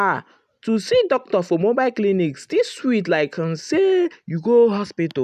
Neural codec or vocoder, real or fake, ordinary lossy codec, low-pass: none; real; none; 14.4 kHz